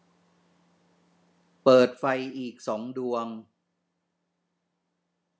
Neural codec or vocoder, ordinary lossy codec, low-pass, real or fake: none; none; none; real